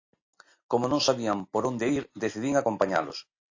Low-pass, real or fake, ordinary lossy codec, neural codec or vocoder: 7.2 kHz; real; AAC, 48 kbps; none